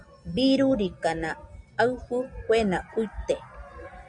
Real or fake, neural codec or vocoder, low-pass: real; none; 9.9 kHz